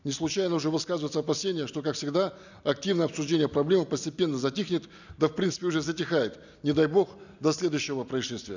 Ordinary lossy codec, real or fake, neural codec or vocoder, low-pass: none; real; none; 7.2 kHz